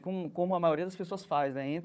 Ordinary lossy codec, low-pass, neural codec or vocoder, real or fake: none; none; codec, 16 kHz, 4 kbps, FunCodec, trained on Chinese and English, 50 frames a second; fake